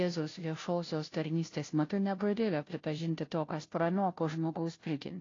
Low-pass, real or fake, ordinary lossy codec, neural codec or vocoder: 7.2 kHz; fake; AAC, 32 kbps; codec, 16 kHz, 0.5 kbps, FunCodec, trained on Chinese and English, 25 frames a second